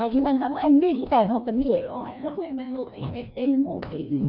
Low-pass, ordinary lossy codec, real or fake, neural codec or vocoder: 5.4 kHz; none; fake; codec, 16 kHz, 1 kbps, FreqCodec, larger model